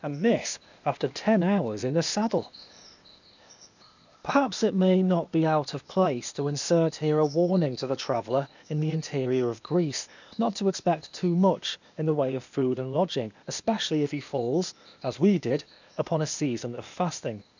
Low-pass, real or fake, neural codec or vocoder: 7.2 kHz; fake; codec, 16 kHz, 0.8 kbps, ZipCodec